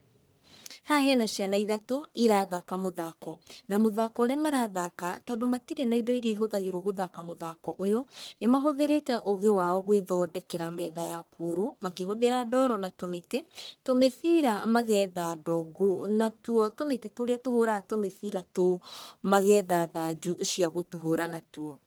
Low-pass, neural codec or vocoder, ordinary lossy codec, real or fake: none; codec, 44.1 kHz, 1.7 kbps, Pupu-Codec; none; fake